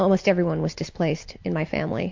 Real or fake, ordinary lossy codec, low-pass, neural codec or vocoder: real; MP3, 48 kbps; 7.2 kHz; none